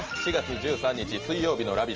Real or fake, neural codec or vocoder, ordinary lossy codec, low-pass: real; none; Opus, 24 kbps; 7.2 kHz